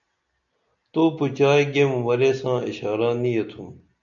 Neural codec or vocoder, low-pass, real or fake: none; 7.2 kHz; real